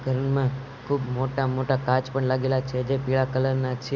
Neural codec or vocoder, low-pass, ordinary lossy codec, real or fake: none; 7.2 kHz; none; real